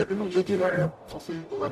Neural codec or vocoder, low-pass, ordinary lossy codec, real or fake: codec, 44.1 kHz, 0.9 kbps, DAC; 14.4 kHz; AAC, 96 kbps; fake